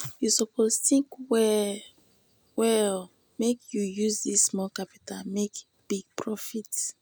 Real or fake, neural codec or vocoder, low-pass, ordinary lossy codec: real; none; none; none